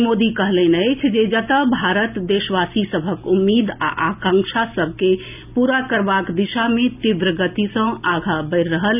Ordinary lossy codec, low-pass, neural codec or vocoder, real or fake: none; 3.6 kHz; none; real